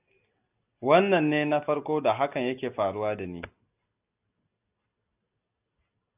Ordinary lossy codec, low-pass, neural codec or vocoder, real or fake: AAC, 32 kbps; 3.6 kHz; none; real